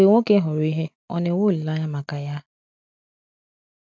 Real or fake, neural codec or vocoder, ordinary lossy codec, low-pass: real; none; none; none